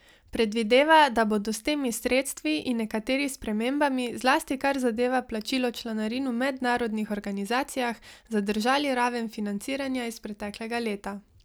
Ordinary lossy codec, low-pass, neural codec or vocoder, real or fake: none; none; none; real